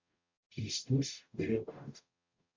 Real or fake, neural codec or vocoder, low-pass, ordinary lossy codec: fake; codec, 44.1 kHz, 0.9 kbps, DAC; 7.2 kHz; MP3, 64 kbps